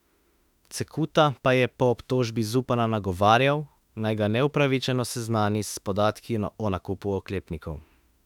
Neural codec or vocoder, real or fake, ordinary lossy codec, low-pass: autoencoder, 48 kHz, 32 numbers a frame, DAC-VAE, trained on Japanese speech; fake; none; 19.8 kHz